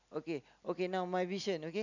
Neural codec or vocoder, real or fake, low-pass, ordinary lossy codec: none; real; 7.2 kHz; none